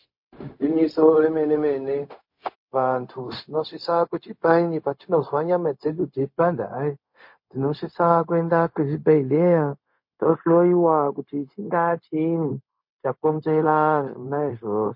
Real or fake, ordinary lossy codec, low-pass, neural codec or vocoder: fake; MP3, 32 kbps; 5.4 kHz; codec, 16 kHz, 0.4 kbps, LongCat-Audio-Codec